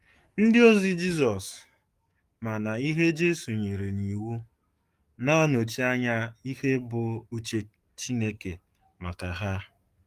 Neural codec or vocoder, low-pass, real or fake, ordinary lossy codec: codec, 44.1 kHz, 7.8 kbps, DAC; 14.4 kHz; fake; Opus, 32 kbps